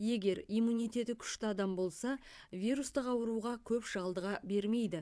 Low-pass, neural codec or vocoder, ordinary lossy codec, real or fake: none; vocoder, 22.05 kHz, 80 mel bands, WaveNeXt; none; fake